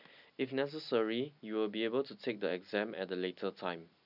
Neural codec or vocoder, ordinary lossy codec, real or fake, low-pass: none; none; real; 5.4 kHz